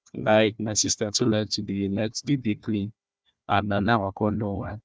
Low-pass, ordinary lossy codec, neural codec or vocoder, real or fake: none; none; codec, 16 kHz, 1 kbps, FunCodec, trained on Chinese and English, 50 frames a second; fake